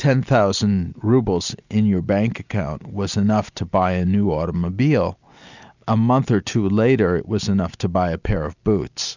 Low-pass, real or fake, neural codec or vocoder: 7.2 kHz; real; none